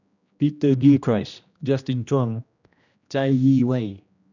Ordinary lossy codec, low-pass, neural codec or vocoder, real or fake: none; 7.2 kHz; codec, 16 kHz, 1 kbps, X-Codec, HuBERT features, trained on general audio; fake